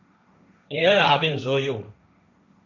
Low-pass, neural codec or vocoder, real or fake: 7.2 kHz; codec, 16 kHz, 1.1 kbps, Voila-Tokenizer; fake